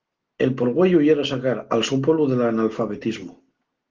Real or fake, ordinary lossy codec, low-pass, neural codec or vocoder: real; Opus, 16 kbps; 7.2 kHz; none